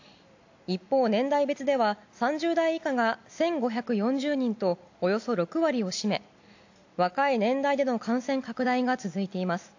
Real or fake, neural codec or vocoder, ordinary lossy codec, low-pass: real; none; AAC, 48 kbps; 7.2 kHz